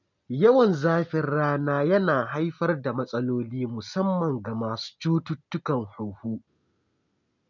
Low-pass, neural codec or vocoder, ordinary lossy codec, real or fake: 7.2 kHz; none; none; real